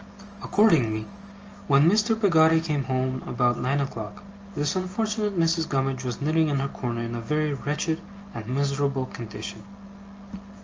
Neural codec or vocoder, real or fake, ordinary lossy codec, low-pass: none; real; Opus, 24 kbps; 7.2 kHz